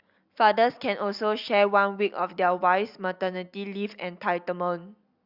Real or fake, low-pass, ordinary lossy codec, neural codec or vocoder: real; 5.4 kHz; Opus, 64 kbps; none